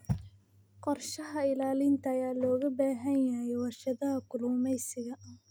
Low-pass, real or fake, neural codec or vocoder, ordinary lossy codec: none; real; none; none